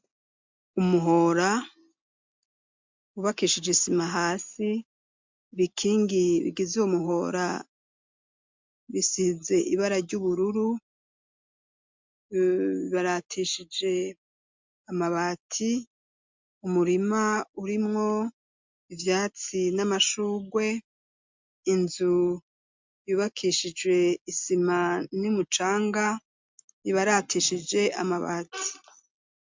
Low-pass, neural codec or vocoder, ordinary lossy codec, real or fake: 7.2 kHz; none; MP3, 64 kbps; real